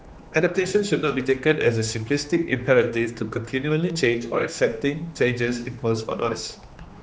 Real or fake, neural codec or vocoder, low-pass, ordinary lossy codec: fake; codec, 16 kHz, 2 kbps, X-Codec, HuBERT features, trained on general audio; none; none